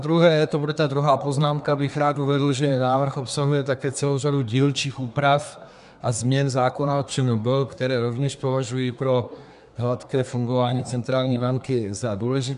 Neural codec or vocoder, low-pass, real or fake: codec, 24 kHz, 1 kbps, SNAC; 10.8 kHz; fake